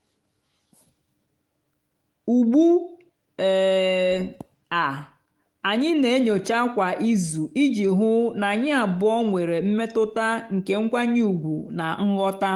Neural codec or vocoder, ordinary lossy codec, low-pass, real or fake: autoencoder, 48 kHz, 128 numbers a frame, DAC-VAE, trained on Japanese speech; Opus, 24 kbps; 19.8 kHz; fake